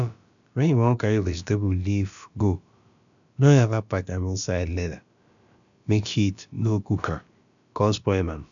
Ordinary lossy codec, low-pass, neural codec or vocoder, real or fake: none; 7.2 kHz; codec, 16 kHz, about 1 kbps, DyCAST, with the encoder's durations; fake